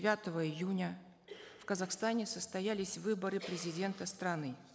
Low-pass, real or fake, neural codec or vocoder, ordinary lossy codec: none; real; none; none